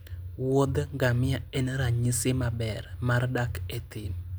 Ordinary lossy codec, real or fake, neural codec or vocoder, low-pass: none; real; none; none